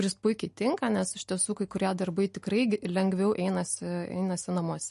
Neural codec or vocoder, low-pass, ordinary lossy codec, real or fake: none; 14.4 kHz; MP3, 48 kbps; real